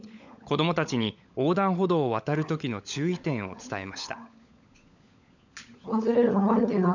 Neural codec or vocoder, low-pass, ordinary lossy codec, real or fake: codec, 16 kHz, 16 kbps, FunCodec, trained on LibriTTS, 50 frames a second; 7.2 kHz; none; fake